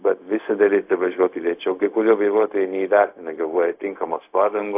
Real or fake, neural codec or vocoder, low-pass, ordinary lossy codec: fake; codec, 16 kHz, 0.4 kbps, LongCat-Audio-Codec; 3.6 kHz; AAC, 32 kbps